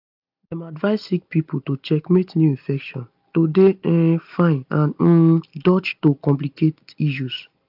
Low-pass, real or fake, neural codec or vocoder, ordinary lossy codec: 5.4 kHz; real; none; AAC, 48 kbps